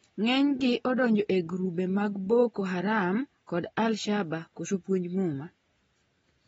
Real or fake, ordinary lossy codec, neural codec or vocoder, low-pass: real; AAC, 24 kbps; none; 19.8 kHz